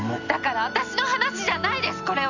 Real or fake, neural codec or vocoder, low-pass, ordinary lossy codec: real; none; 7.2 kHz; none